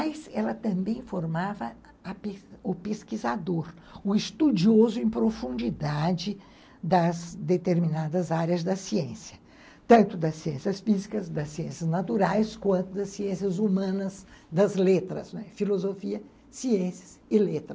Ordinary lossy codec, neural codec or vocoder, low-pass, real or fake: none; none; none; real